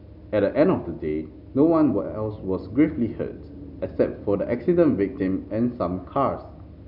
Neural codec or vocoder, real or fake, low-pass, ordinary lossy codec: none; real; 5.4 kHz; none